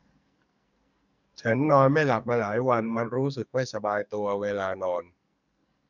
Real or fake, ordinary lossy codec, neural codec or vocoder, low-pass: fake; none; codec, 44.1 kHz, 2.6 kbps, SNAC; 7.2 kHz